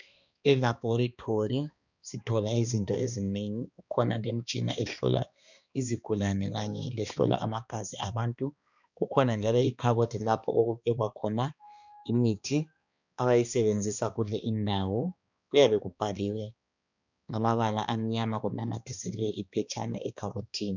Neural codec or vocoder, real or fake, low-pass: codec, 16 kHz, 2 kbps, X-Codec, HuBERT features, trained on balanced general audio; fake; 7.2 kHz